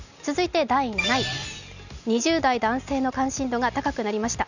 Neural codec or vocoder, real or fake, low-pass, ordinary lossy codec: none; real; 7.2 kHz; none